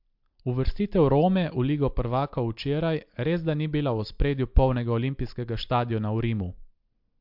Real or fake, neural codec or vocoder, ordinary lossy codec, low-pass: real; none; MP3, 48 kbps; 5.4 kHz